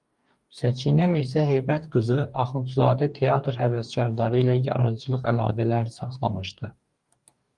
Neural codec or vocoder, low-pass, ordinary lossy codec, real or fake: codec, 44.1 kHz, 2.6 kbps, DAC; 10.8 kHz; Opus, 32 kbps; fake